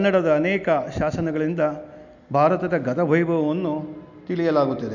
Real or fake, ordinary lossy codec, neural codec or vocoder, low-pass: real; none; none; 7.2 kHz